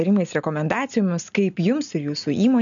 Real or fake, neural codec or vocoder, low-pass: real; none; 7.2 kHz